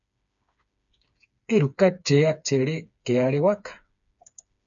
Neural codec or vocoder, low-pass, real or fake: codec, 16 kHz, 4 kbps, FreqCodec, smaller model; 7.2 kHz; fake